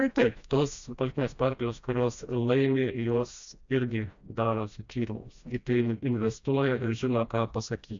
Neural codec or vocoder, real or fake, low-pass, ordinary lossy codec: codec, 16 kHz, 1 kbps, FreqCodec, smaller model; fake; 7.2 kHz; MP3, 64 kbps